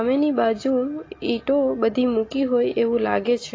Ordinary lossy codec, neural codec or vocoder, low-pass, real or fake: AAC, 32 kbps; none; 7.2 kHz; real